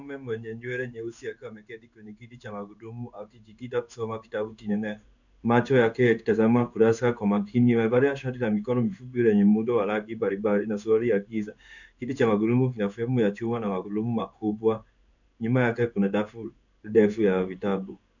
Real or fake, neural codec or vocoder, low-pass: fake; codec, 16 kHz in and 24 kHz out, 1 kbps, XY-Tokenizer; 7.2 kHz